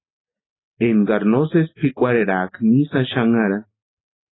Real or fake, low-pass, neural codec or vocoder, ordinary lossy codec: real; 7.2 kHz; none; AAC, 16 kbps